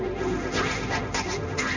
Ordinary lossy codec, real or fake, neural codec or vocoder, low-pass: none; fake; codec, 16 kHz, 1.1 kbps, Voila-Tokenizer; 7.2 kHz